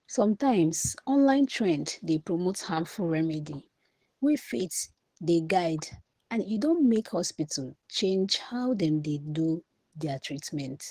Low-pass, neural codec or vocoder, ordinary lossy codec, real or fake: 14.4 kHz; codec, 44.1 kHz, 7.8 kbps, DAC; Opus, 16 kbps; fake